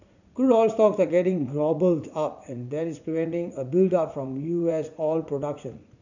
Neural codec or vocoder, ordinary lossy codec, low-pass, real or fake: vocoder, 44.1 kHz, 80 mel bands, Vocos; none; 7.2 kHz; fake